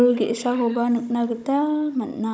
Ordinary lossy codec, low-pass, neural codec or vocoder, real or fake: none; none; codec, 16 kHz, 16 kbps, FunCodec, trained on Chinese and English, 50 frames a second; fake